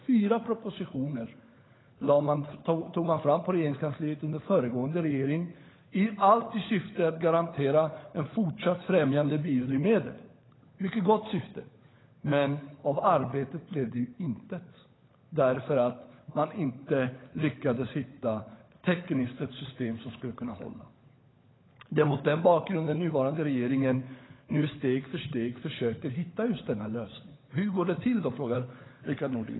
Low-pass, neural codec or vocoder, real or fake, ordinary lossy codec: 7.2 kHz; codec, 16 kHz, 16 kbps, FunCodec, trained on LibriTTS, 50 frames a second; fake; AAC, 16 kbps